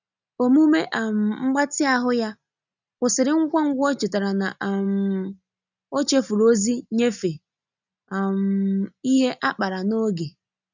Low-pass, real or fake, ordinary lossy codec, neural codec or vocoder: 7.2 kHz; real; none; none